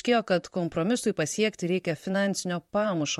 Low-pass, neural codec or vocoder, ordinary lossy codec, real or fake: 19.8 kHz; vocoder, 44.1 kHz, 128 mel bands, Pupu-Vocoder; MP3, 64 kbps; fake